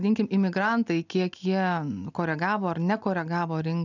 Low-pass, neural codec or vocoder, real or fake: 7.2 kHz; none; real